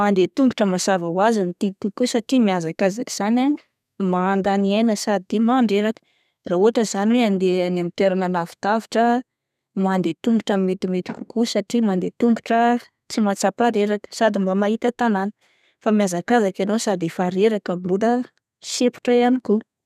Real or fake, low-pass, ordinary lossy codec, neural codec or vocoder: fake; 14.4 kHz; none; codec, 32 kHz, 1.9 kbps, SNAC